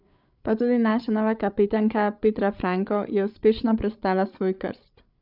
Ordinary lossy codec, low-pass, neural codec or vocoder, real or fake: none; 5.4 kHz; codec, 16 kHz, 8 kbps, FreqCodec, larger model; fake